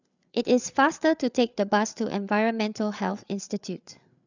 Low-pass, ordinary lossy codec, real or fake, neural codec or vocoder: 7.2 kHz; none; fake; codec, 16 kHz, 8 kbps, FreqCodec, larger model